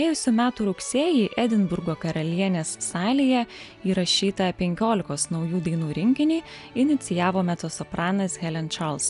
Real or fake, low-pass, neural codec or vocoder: real; 10.8 kHz; none